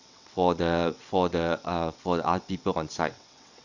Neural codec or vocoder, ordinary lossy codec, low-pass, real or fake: vocoder, 22.05 kHz, 80 mel bands, WaveNeXt; none; 7.2 kHz; fake